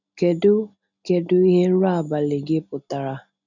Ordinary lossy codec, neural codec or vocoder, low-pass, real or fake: none; none; 7.2 kHz; real